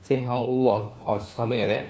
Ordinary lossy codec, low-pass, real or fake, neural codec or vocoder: none; none; fake; codec, 16 kHz, 1 kbps, FunCodec, trained on LibriTTS, 50 frames a second